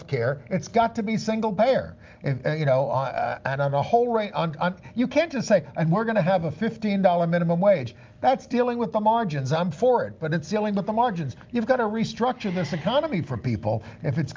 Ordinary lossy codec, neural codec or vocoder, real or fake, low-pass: Opus, 24 kbps; none; real; 7.2 kHz